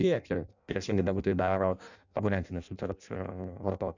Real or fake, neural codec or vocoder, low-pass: fake; codec, 16 kHz in and 24 kHz out, 0.6 kbps, FireRedTTS-2 codec; 7.2 kHz